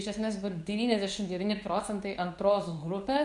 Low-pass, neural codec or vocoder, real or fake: 10.8 kHz; codec, 24 kHz, 0.9 kbps, WavTokenizer, medium speech release version 2; fake